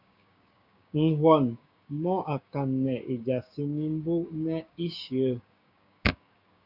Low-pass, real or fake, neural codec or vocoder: 5.4 kHz; fake; codec, 16 kHz, 6 kbps, DAC